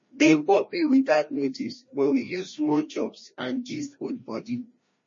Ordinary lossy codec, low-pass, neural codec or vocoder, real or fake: MP3, 32 kbps; 7.2 kHz; codec, 16 kHz, 1 kbps, FreqCodec, larger model; fake